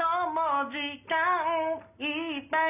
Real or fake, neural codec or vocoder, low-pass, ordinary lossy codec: real; none; 3.6 kHz; none